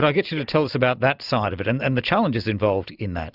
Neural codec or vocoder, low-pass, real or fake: none; 5.4 kHz; real